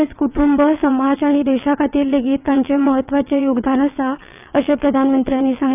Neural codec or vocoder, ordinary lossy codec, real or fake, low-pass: vocoder, 22.05 kHz, 80 mel bands, WaveNeXt; none; fake; 3.6 kHz